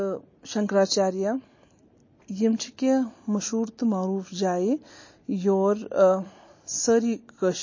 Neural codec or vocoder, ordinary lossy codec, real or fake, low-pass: none; MP3, 32 kbps; real; 7.2 kHz